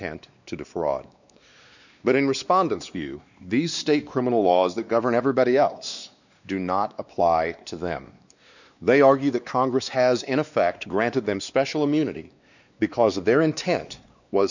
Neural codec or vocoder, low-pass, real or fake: codec, 16 kHz, 2 kbps, X-Codec, WavLM features, trained on Multilingual LibriSpeech; 7.2 kHz; fake